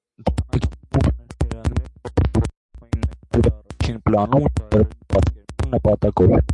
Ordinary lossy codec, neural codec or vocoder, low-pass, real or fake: MP3, 48 kbps; none; 10.8 kHz; real